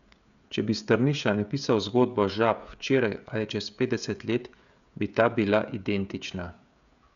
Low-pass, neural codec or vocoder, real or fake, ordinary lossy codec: 7.2 kHz; codec, 16 kHz, 16 kbps, FreqCodec, smaller model; fake; none